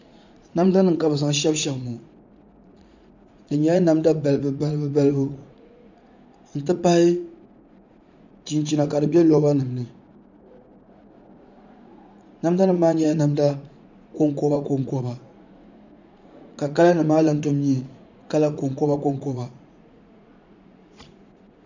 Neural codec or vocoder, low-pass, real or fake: vocoder, 44.1 kHz, 80 mel bands, Vocos; 7.2 kHz; fake